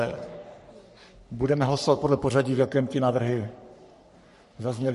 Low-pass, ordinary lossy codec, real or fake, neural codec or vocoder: 14.4 kHz; MP3, 48 kbps; fake; codec, 44.1 kHz, 3.4 kbps, Pupu-Codec